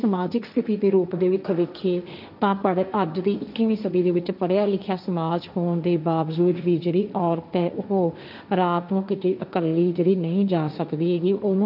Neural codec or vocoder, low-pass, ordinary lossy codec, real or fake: codec, 16 kHz, 1.1 kbps, Voila-Tokenizer; 5.4 kHz; none; fake